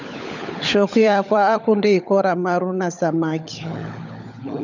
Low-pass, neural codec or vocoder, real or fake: 7.2 kHz; codec, 16 kHz, 16 kbps, FunCodec, trained on LibriTTS, 50 frames a second; fake